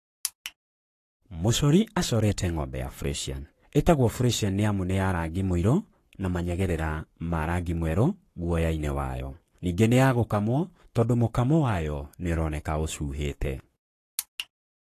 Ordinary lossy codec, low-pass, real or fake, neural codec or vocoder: AAC, 48 kbps; 14.4 kHz; fake; codec, 44.1 kHz, 7.8 kbps, Pupu-Codec